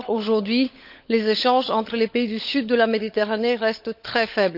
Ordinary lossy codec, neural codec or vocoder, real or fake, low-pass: none; codec, 16 kHz, 16 kbps, FunCodec, trained on LibriTTS, 50 frames a second; fake; 5.4 kHz